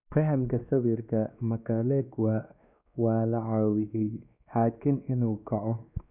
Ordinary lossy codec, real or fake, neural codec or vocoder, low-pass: none; fake; codec, 16 kHz, 2 kbps, X-Codec, WavLM features, trained on Multilingual LibriSpeech; 3.6 kHz